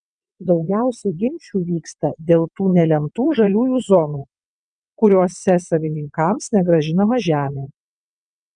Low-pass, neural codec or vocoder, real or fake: 9.9 kHz; vocoder, 22.05 kHz, 80 mel bands, WaveNeXt; fake